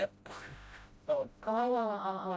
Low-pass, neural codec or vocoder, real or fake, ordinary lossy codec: none; codec, 16 kHz, 0.5 kbps, FreqCodec, smaller model; fake; none